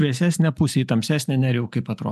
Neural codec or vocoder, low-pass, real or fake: none; 14.4 kHz; real